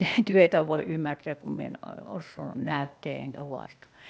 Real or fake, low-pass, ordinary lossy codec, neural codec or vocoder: fake; none; none; codec, 16 kHz, 0.8 kbps, ZipCodec